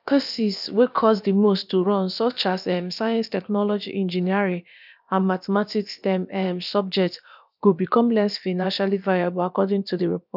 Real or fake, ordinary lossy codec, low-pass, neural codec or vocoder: fake; none; 5.4 kHz; codec, 16 kHz, about 1 kbps, DyCAST, with the encoder's durations